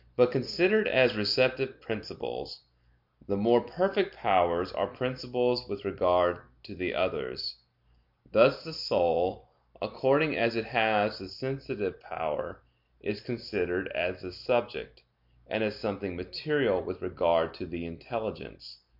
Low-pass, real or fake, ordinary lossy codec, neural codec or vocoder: 5.4 kHz; real; MP3, 48 kbps; none